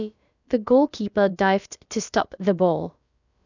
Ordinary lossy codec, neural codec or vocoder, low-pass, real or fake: none; codec, 16 kHz, about 1 kbps, DyCAST, with the encoder's durations; 7.2 kHz; fake